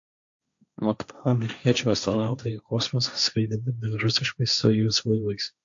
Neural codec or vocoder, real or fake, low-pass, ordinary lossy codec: codec, 16 kHz, 1.1 kbps, Voila-Tokenizer; fake; 7.2 kHz; AAC, 64 kbps